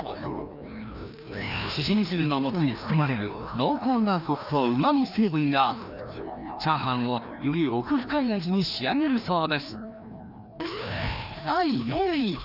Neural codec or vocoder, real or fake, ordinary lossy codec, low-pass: codec, 16 kHz, 1 kbps, FreqCodec, larger model; fake; none; 5.4 kHz